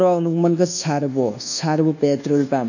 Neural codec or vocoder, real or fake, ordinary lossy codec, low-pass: codec, 24 kHz, 1.2 kbps, DualCodec; fake; none; 7.2 kHz